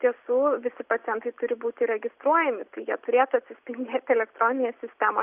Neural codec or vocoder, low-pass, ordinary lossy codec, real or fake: none; 3.6 kHz; Opus, 64 kbps; real